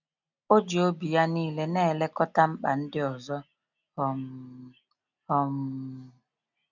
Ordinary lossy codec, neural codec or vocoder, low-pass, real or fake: none; none; 7.2 kHz; real